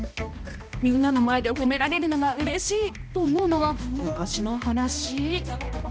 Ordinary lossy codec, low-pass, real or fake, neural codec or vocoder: none; none; fake; codec, 16 kHz, 1 kbps, X-Codec, HuBERT features, trained on balanced general audio